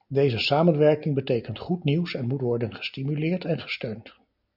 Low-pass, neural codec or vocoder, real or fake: 5.4 kHz; none; real